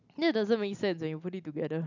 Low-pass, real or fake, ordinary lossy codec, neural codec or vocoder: 7.2 kHz; real; none; none